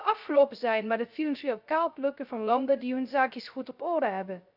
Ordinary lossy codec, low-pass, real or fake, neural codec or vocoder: none; 5.4 kHz; fake; codec, 16 kHz, 0.3 kbps, FocalCodec